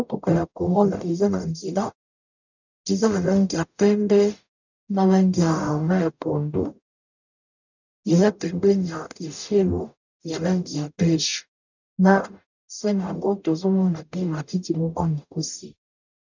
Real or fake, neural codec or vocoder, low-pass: fake; codec, 44.1 kHz, 0.9 kbps, DAC; 7.2 kHz